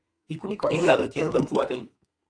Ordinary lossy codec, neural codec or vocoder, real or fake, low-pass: AAC, 64 kbps; codec, 32 kHz, 1.9 kbps, SNAC; fake; 9.9 kHz